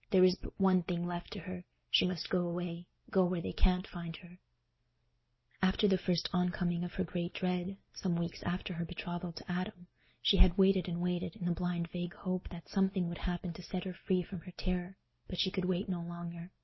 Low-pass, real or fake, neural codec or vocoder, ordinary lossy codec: 7.2 kHz; real; none; MP3, 24 kbps